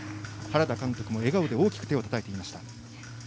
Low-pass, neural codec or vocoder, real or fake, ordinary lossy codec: none; none; real; none